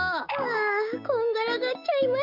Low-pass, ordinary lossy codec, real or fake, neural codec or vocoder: 5.4 kHz; none; fake; codec, 16 kHz, 6 kbps, DAC